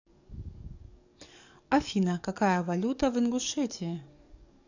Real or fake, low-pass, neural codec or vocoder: fake; 7.2 kHz; codec, 44.1 kHz, 7.8 kbps, DAC